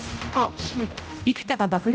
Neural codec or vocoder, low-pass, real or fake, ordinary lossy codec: codec, 16 kHz, 0.5 kbps, X-Codec, HuBERT features, trained on general audio; none; fake; none